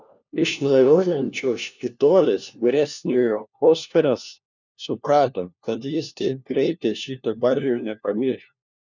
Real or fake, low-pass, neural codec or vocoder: fake; 7.2 kHz; codec, 16 kHz, 1 kbps, FunCodec, trained on LibriTTS, 50 frames a second